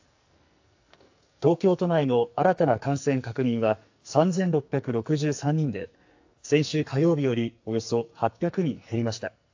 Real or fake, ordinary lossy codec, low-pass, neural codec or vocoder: fake; AAC, 48 kbps; 7.2 kHz; codec, 44.1 kHz, 2.6 kbps, SNAC